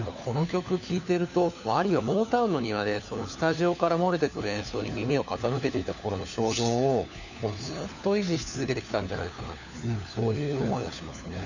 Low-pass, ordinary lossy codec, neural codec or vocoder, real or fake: 7.2 kHz; none; codec, 16 kHz, 4 kbps, FunCodec, trained on LibriTTS, 50 frames a second; fake